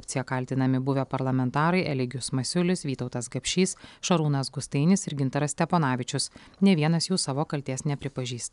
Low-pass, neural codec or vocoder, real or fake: 10.8 kHz; none; real